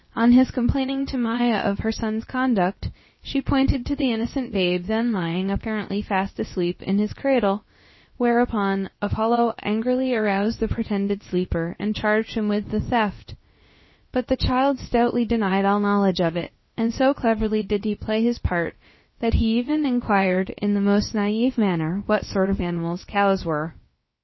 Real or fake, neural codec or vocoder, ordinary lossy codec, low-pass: fake; codec, 16 kHz, about 1 kbps, DyCAST, with the encoder's durations; MP3, 24 kbps; 7.2 kHz